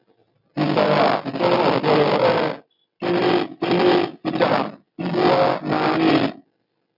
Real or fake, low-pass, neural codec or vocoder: real; 5.4 kHz; none